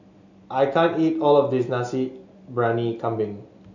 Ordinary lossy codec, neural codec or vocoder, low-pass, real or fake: none; none; 7.2 kHz; real